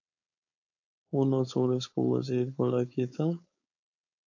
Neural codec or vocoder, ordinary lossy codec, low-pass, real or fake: codec, 16 kHz, 4.8 kbps, FACodec; AAC, 48 kbps; 7.2 kHz; fake